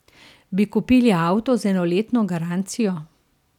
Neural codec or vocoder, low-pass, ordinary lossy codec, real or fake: none; 19.8 kHz; none; real